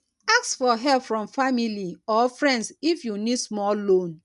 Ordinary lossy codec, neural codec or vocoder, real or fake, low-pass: none; none; real; 10.8 kHz